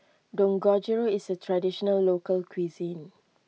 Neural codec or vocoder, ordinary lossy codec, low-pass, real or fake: none; none; none; real